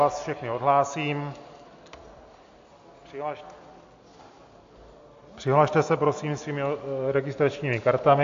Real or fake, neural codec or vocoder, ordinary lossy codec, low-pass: real; none; AAC, 48 kbps; 7.2 kHz